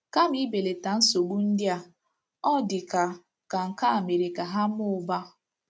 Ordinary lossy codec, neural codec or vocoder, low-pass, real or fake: none; none; none; real